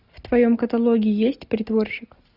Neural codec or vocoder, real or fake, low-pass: none; real; 5.4 kHz